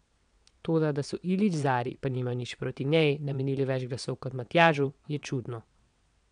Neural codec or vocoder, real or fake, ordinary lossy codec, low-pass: vocoder, 22.05 kHz, 80 mel bands, WaveNeXt; fake; none; 9.9 kHz